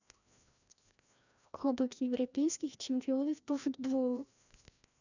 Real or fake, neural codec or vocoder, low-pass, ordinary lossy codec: fake; codec, 16 kHz, 1 kbps, FreqCodec, larger model; 7.2 kHz; none